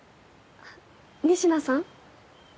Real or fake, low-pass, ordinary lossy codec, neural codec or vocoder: real; none; none; none